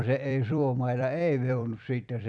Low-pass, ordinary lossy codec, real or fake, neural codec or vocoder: 9.9 kHz; none; fake; vocoder, 44.1 kHz, 128 mel bands every 256 samples, BigVGAN v2